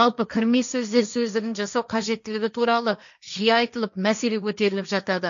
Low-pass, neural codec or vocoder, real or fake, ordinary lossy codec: 7.2 kHz; codec, 16 kHz, 1.1 kbps, Voila-Tokenizer; fake; none